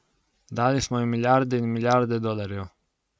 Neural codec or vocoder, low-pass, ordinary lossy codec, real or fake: none; none; none; real